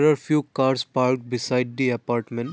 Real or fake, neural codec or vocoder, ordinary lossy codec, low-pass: real; none; none; none